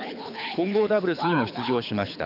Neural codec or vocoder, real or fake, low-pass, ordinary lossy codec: codec, 24 kHz, 6 kbps, HILCodec; fake; 5.4 kHz; none